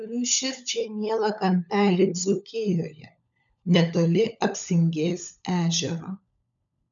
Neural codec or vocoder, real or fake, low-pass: codec, 16 kHz, 16 kbps, FunCodec, trained on LibriTTS, 50 frames a second; fake; 7.2 kHz